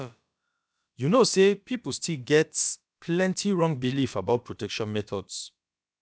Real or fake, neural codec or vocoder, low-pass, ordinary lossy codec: fake; codec, 16 kHz, about 1 kbps, DyCAST, with the encoder's durations; none; none